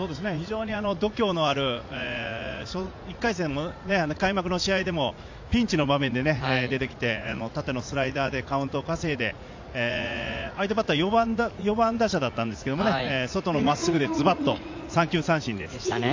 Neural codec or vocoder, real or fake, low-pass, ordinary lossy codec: vocoder, 44.1 kHz, 80 mel bands, Vocos; fake; 7.2 kHz; AAC, 48 kbps